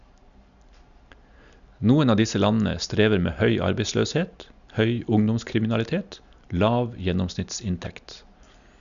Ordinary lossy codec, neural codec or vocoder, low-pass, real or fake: none; none; 7.2 kHz; real